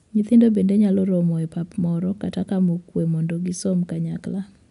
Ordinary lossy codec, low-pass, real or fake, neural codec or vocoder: none; 10.8 kHz; real; none